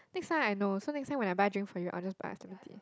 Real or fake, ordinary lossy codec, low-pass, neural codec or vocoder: real; none; none; none